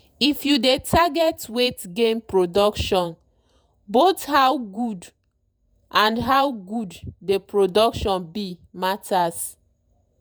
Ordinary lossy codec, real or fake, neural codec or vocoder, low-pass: none; fake; vocoder, 48 kHz, 128 mel bands, Vocos; none